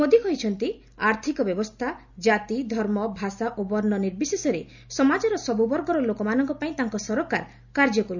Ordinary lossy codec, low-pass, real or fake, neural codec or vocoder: none; 7.2 kHz; real; none